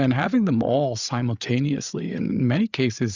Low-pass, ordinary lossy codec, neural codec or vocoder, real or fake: 7.2 kHz; Opus, 64 kbps; codec, 16 kHz, 8 kbps, FreqCodec, larger model; fake